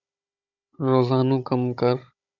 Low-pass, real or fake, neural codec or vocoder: 7.2 kHz; fake; codec, 16 kHz, 16 kbps, FunCodec, trained on Chinese and English, 50 frames a second